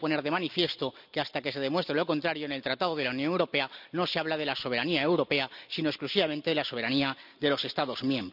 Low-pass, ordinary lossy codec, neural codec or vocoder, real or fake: 5.4 kHz; none; none; real